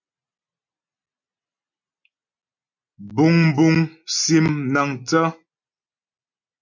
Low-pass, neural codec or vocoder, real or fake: 7.2 kHz; none; real